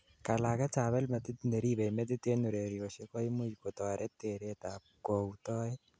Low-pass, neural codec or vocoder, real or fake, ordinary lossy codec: none; none; real; none